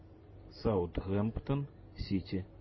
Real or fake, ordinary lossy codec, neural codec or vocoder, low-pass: fake; MP3, 24 kbps; vocoder, 44.1 kHz, 128 mel bands every 256 samples, BigVGAN v2; 7.2 kHz